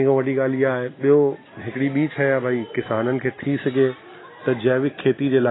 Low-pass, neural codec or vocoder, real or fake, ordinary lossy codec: 7.2 kHz; none; real; AAC, 16 kbps